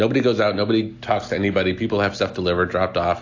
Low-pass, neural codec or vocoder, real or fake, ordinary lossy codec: 7.2 kHz; none; real; AAC, 48 kbps